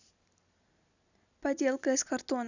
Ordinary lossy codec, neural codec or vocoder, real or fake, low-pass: none; none; real; 7.2 kHz